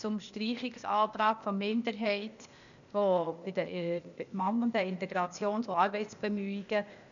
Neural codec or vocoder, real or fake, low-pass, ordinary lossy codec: codec, 16 kHz, 0.8 kbps, ZipCodec; fake; 7.2 kHz; none